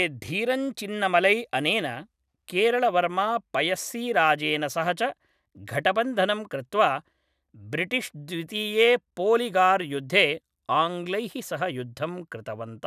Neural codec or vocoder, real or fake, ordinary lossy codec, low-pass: none; real; none; 14.4 kHz